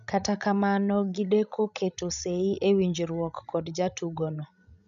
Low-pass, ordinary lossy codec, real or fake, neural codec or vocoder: 7.2 kHz; none; fake; codec, 16 kHz, 16 kbps, FreqCodec, larger model